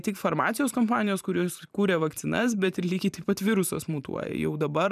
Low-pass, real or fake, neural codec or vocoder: 14.4 kHz; fake; vocoder, 44.1 kHz, 128 mel bands every 512 samples, BigVGAN v2